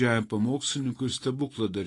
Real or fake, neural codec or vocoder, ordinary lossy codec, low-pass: real; none; AAC, 32 kbps; 10.8 kHz